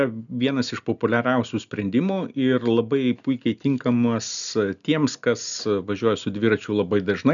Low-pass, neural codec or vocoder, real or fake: 7.2 kHz; none; real